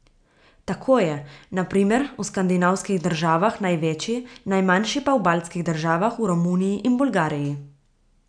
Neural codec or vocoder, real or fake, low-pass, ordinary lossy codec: none; real; 9.9 kHz; none